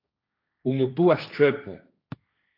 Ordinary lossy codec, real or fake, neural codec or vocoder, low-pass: AAC, 32 kbps; fake; codec, 16 kHz, 1.1 kbps, Voila-Tokenizer; 5.4 kHz